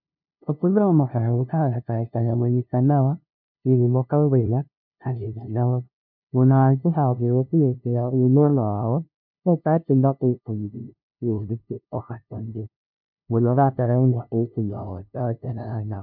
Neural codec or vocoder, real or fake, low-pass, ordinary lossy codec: codec, 16 kHz, 0.5 kbps, FunCodec, trained on LibriTTS, 25 frames a second; fake; 5.4 kHz; MP3, 48 kbps